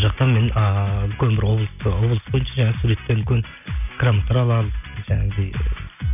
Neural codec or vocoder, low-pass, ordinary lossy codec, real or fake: none; 3.6 kHz; none; real